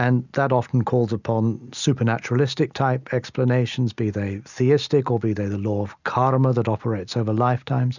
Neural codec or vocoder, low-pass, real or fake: none; 7.2 kHz; real